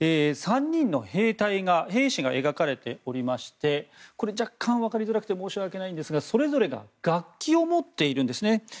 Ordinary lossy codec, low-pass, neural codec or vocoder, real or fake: none; none; none; real